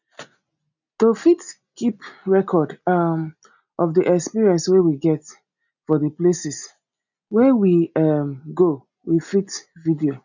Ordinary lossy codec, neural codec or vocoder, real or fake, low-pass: none; none; real; 7.2 kHz